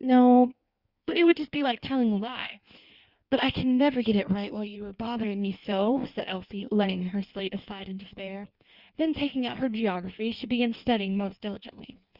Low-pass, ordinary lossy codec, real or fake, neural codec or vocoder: 5.4 kHz; Opus, 64 kbps; fake; codec, 16 kHz in and 24 kHz out, 1.1 kbps, FireRedTTS-2 codec